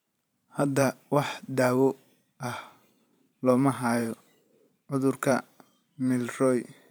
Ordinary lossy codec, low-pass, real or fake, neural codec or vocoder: none; none; fake; vocoder, 44.1 kHz, 128 mel bands every 512 samples, BigVGAN v2